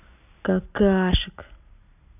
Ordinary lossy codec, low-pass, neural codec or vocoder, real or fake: none; 3.6 kHz; none; real